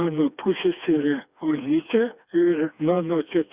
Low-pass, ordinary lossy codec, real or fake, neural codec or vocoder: 3.6 kHz; Opus, 64 kbps; fake; codec, 16 kHz, 2 kbps, FreqCodec, smaller model